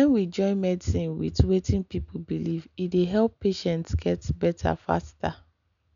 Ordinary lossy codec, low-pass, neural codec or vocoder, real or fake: none; 7.2 kHz; none; real